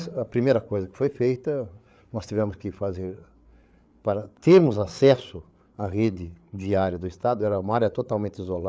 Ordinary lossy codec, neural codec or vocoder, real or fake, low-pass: none; codec, 16 kHz, 8 kbps, FreqCodec, larger model; fake; none